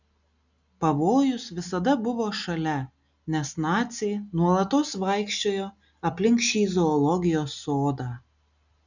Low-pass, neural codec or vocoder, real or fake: 7.2 kHz; none; real